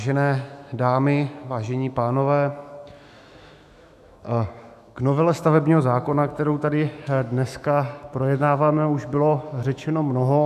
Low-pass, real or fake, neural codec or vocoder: 14.4 kHz; fake; autoencoder, 48 kHz, 128 numbers a frame, DAC-VAE, trained on Japanese speech